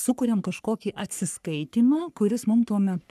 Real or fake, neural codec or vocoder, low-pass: fake; codec, 44.1 kHz, 3.4 kbps, Pupu-Codec; 14.4 kHz